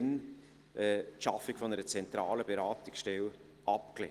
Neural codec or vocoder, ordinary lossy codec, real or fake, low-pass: none; Opus, 24 kbps; real; 14.4 kHz